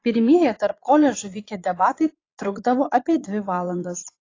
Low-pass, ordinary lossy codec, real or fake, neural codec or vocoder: 7.2 kHz; AAC, 32 kbps; fake; vocoder, 22.05 kHz, 80 mel bands, Vocos